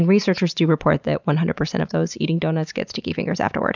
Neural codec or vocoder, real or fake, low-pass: none; real; 7.2 kHz